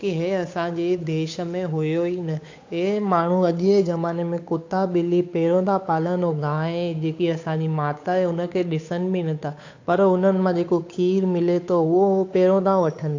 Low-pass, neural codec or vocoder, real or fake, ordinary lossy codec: 7.2 kHz; codec, 16 kHz, 8 kbps, FunCodec, trained on Chinese and English, 25 frames a second; fake; AAC, 48 kbps